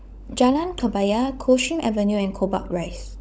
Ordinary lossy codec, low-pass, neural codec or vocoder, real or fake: none; none; codec, 16 kHz, 16 kbps, FunCodec, trained on LibriTTS, 50 frames a second; fake